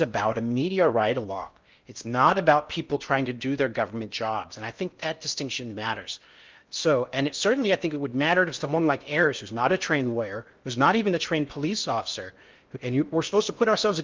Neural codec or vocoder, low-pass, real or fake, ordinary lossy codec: codec, 16 kHz in and 24 kHz out, 0.6 kbps, FocalCodec, streaming, 4096 codes; 7.2 kHz; fake; Opus, 24 kbps